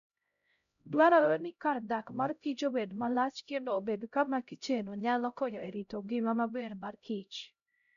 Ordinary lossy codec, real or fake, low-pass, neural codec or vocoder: none; fake; 7.2 kHz; codec, 16 kHz, 0.5 kbps, X-Codec, HuBERT features, trained on LibriSpeech